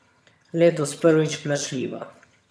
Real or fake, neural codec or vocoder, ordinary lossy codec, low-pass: fake; vocoder, 22.05 kHz, 80 mel bands, HiFi-GAN; none; none